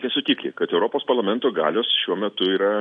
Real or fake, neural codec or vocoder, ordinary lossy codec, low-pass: real; none; AAC, 64 kbps; 9.9 kHz